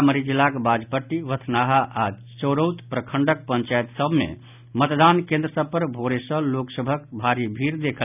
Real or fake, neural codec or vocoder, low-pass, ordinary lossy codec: real; none; 3.6 kHz; none